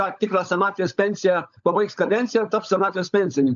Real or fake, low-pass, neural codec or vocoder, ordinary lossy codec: fake; 7.2 kHz; codec, 16 kHz, 16 kbps, FunCodec, trained on LibriTTS, 50 frames a second; MP3, 64 kbps